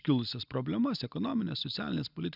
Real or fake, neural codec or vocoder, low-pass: real; none; 5.4 kHz